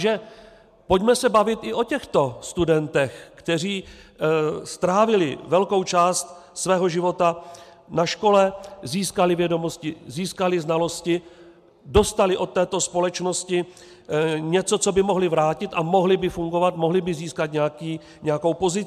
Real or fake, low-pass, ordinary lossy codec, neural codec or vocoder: real; 14.4 kHz; MP3, 96 kbps; none